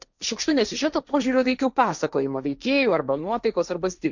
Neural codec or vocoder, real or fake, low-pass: codec, 16 kHz, 1.1 kbps, Voila-Tokenizer; fake; 7.2 kHz